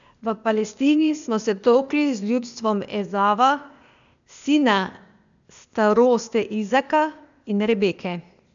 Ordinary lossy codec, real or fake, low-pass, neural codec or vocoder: none; fake; 7.2 kHz; codec, 16 kHz, 0.8 kbps, ZipCodec